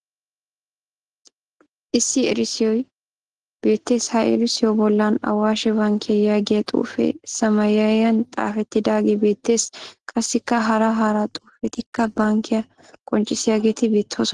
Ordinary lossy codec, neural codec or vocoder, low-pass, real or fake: Opus, 16 kbps; none; 10.8 kHz; real